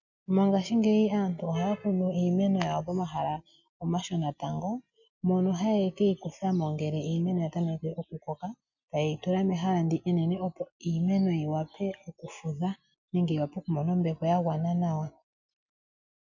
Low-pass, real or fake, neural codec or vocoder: 7.2 kHz; real; none